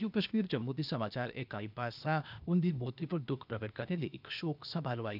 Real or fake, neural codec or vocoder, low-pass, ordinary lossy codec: fake; codec, 16 kHz, 0.8 kbps, ZipCodec; 5.4 kHz; none